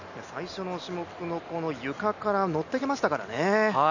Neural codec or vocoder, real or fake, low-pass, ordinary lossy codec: none; real; 7.2 kHz; none